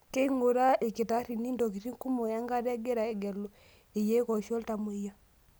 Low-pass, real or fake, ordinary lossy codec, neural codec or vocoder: none; real; none; none